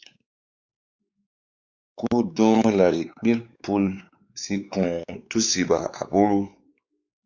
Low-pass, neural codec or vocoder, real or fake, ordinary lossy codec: 7.2 kHz; codec, 16 kHz, 4 kbps, X-Codec, WavLM features, trained on Multilingual LibriSpeech; fake; Opus, 64 kbps